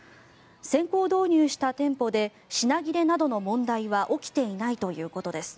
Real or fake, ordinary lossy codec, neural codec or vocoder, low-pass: real; none; none; none